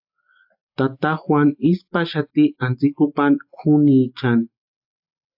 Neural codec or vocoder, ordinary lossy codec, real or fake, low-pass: none; AAC, 48 kbps; real; 5.4 kHz